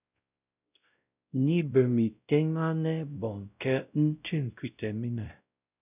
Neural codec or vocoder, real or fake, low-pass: codec, 16 kHz, 0.5 kbps, X-Codec, WavLM features, trained on Multilingual LibriSpeech; fake; 3.6 kHz